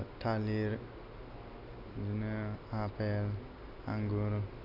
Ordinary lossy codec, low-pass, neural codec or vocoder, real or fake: none; 5.4 kHz; none; real